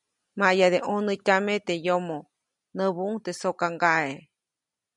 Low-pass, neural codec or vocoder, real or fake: 10.8 kHz; none; real